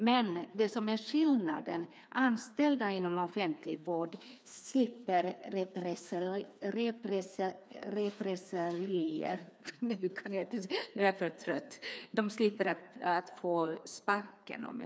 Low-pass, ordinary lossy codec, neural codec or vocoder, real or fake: none; none; codec, 16 kHz, 2 kbps, FreqCodec, larger model; fake